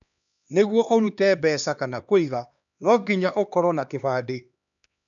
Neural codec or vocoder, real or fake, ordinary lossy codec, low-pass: codec, 16 kHz, 2 kbps, X-Codec, HuBERT features, trained on LibriSpeech; fake; none; 7.2 kHz